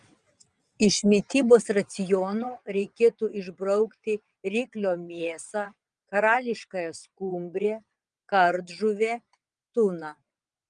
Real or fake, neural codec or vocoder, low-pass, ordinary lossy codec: fake; vocoder, 22.05 kHz, 80 mel bands, WaveNeXt; 9.9 kHz; Opus, 32 kbps